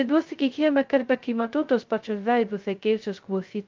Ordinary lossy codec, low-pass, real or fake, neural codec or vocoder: Opus, 32 kbps; 7.2 kHz; fake; codec, 16 kHz, 0.2 kbps, FocalCodec